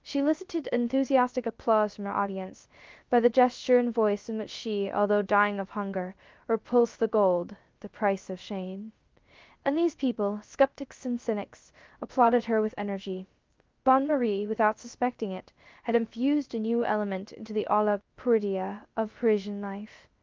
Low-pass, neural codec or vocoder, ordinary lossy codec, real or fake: 7.2 kHz; codec, 16 kHz, 0.3 kbps, FocalCodec; Opus, 32 kbps; fake